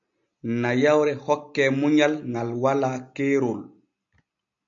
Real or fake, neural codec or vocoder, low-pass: real; none; 7.2 kHz